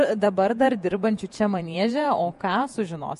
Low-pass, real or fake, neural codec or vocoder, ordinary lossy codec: 14.4 kHz; fake; vocoder, 48 kHz, 128 mel bands, Vocos; MP3, 48 kbps